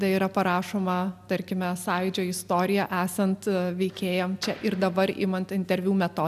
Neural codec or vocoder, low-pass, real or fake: none; 14.4 kHz; real